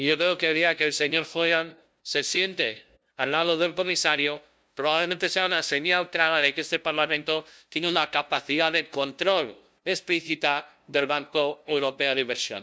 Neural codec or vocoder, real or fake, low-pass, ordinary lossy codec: codec, 16 kHz, 0.5 kbps, FunCodec, trained on LibriTTS, 25 frames a second; fake; none; none